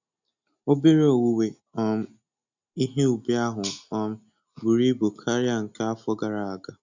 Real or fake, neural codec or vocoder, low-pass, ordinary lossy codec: real; none; 7.2 kHz; none